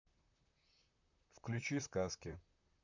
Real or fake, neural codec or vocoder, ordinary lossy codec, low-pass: fake; vocoder, 44.1 kHz, 128 mel bands, Pupu-Vocoder; none; 7.2 kHz